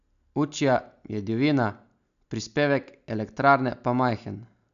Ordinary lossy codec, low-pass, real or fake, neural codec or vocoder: none; 7.2 kHz; real; none